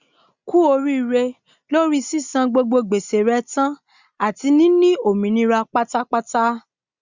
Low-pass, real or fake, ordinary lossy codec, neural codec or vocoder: 7.2 kHz; real; Opus, 64 kbps; none